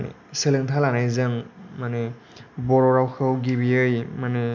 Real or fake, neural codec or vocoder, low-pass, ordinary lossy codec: real; none; 7.2 kHz; none